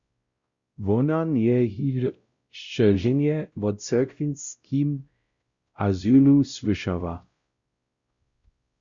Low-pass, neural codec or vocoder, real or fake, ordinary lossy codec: 7.2 kHz; codec, 16 kHz, 0.5 kbps, X-Codec, WavLM features, trained on Multilingual LibriSpeech; fake; Opus, 64 kbps